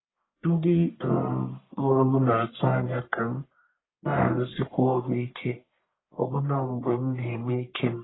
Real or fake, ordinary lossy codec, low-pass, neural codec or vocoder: fake; AAC, 16 kbps; 7.2 kHz; codec, 44.1 kHz, 1.7 kbps, Pupu-Codec